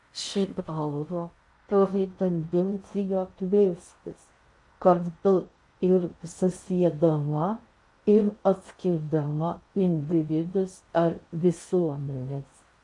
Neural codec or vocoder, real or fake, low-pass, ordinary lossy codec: codec, 16 kHz in and 24 kHz out, 0.6 kbps, FocalCodec, streaming, 4096 codes; fake; 10.8 kHz; MP3, 48 kbps